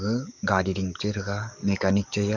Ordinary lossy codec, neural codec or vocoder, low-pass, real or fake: none; codec, 44.1 kHz, 7.8 kbps, DAC; 7.2 kHz; fake